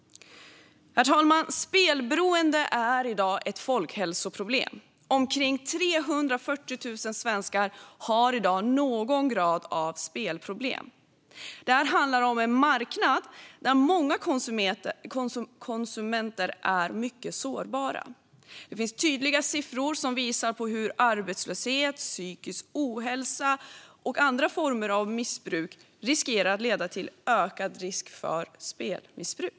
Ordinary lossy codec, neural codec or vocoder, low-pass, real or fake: none; none; none; real